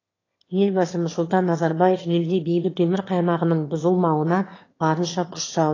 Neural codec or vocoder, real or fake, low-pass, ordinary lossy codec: autoencoder, 22.05 kHz, a latent of 192 numbers a frame, VITS, trained on one speaker; fake; 7.2 kHz; AAC, 32 kbps